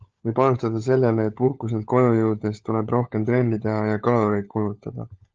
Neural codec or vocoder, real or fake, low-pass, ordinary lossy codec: codec, 16 kHz, 16 kbps, FunCodec, trained on LibriTTS, 50 frames a second; fake; 7.2 kHz; Opus, 24 kbps